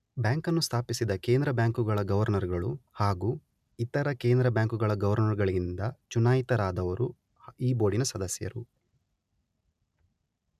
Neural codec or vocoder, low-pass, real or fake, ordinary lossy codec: none; 14.4 kHz; real; none